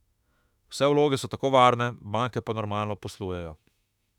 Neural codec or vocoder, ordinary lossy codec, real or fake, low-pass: autoencoder, 48 kHz, 32 numbers a frame, DAC-VAE, trained on Japanese speech; none; fake; 19.8 kHz